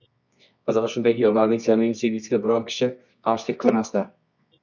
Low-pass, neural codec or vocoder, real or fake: 7.2 kHz; codec, 24 kHz, 0.9 kbps, WavTokenizer, medium music audio release; fake